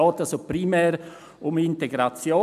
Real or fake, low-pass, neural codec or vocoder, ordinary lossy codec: real; 14.4 kHz; none; none